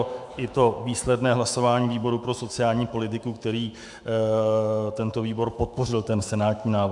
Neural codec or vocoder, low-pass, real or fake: autoencoder, 48 kHz, 128 numbers a frame, DAC-VAE, trained on Japanese speech; 10.8 kHz; fake